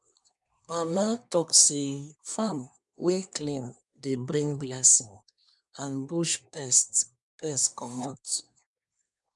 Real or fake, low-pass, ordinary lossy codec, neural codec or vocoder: fake; 10.8 kHz; none; codec, 24 kHz, 1 kbps, SNAC